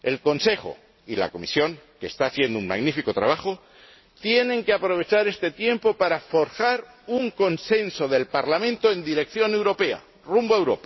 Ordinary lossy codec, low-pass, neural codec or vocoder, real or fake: MP3, 24 kbps; 7.2 kHz; none; real